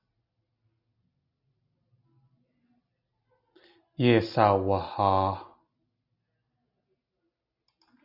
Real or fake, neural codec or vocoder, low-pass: real; none; 5.4 kHz